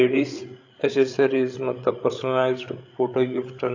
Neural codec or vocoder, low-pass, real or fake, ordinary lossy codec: codec, 16 kHz, 8 kbps, FreqCodec, larger model; 7.2 kHz; fake; AAC, 48 kbps